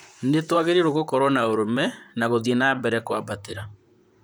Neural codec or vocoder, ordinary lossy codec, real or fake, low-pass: vocoder, 44.1 kHz, 128 mel bands, Pupu-Vocoder; none; fake; none